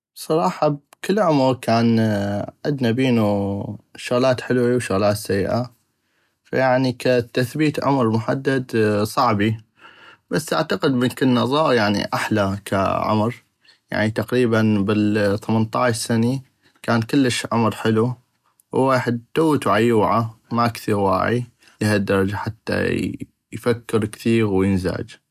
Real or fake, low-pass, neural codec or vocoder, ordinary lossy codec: real; 14.4 kHz; none; none